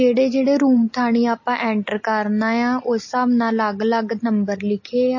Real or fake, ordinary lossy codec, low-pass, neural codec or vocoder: real; MP3, 32 kbps; 7.2 kHz; none